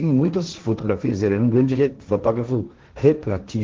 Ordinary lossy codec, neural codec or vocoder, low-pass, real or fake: Opus, 16 kbps; codec, 16 kHz, 1.1 kbps, Voila-Tokenizer; 7.2 kHz; fake